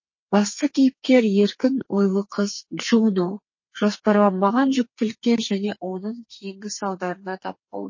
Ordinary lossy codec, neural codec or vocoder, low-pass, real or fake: MP3, 32 kbps; codec, 32 kHz, 1.9 kbps, SNAC; 7.2 kHz; fake